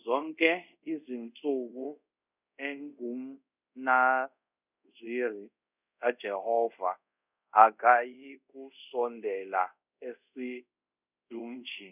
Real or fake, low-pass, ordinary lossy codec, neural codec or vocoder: fake; 3.6 kHz; none; codec, 24 kHz, 0.5 kbps, DualCodec